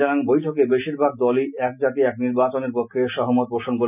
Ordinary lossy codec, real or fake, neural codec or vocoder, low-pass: none; real; none; 3.6 kHz